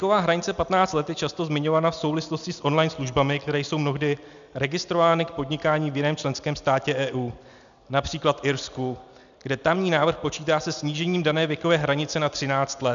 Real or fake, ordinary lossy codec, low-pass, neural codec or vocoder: real; MP3, 96 kbps; 7.2 kHz; none